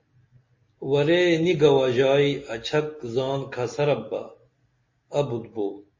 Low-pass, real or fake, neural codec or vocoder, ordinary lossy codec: 7.2 kHz; real; none; MP3, 32 kbps